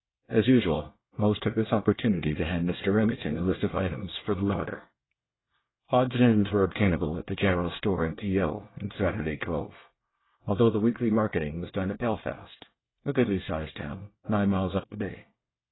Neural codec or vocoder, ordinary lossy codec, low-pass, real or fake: codec, 24 kHz, 1 kbps, SNAC; AAC, 16 kbps; 7.2 kHz; fake